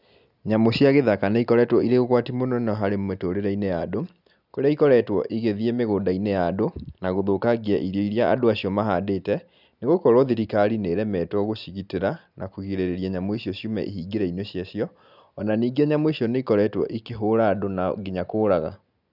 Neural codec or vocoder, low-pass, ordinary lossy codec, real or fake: none; 5.4 kHz; none; real